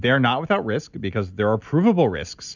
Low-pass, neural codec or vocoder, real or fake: 7.2 kHz; none; real